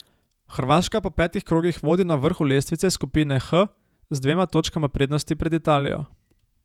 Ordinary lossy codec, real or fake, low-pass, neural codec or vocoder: none; fake; 19.8 kHz; vocoder, 48 kHz, 128 mel bands, Vocos